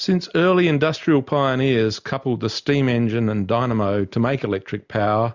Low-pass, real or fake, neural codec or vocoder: 7.2 kHz; real; none